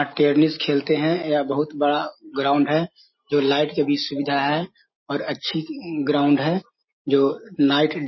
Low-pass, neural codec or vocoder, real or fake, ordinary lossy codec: 7.2 kHz; none; real; MP3, 24 kbps